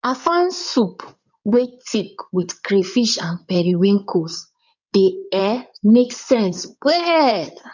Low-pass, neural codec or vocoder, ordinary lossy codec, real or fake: 7.2 kHz; codec, 16 kHz in and 24 kHz out, 2.2 kbps, FireRedTTS-2 codec; none; fake